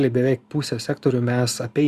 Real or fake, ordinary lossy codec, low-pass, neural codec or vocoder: real; Opus, 64 kbps; 14.4 kHz; none